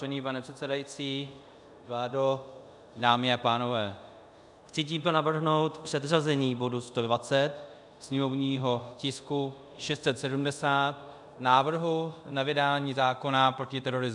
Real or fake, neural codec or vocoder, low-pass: fake; codec, 24 kHz, 0.5 kbps, DualCodec; 10.8 kHz